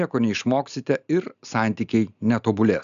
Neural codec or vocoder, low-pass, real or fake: none; 7.2 kHz; real